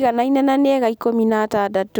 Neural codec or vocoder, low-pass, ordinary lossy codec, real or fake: none; none; none; real